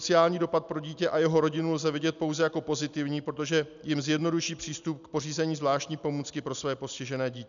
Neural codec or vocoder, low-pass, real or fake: none; 7.2 kHz; real